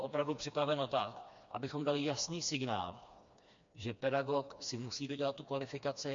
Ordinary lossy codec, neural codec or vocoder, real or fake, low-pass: MP3, 48 kbps; codec, 16 kHz, 2 kbps, FreqCodec, smaller model; fake; 7.2 kHz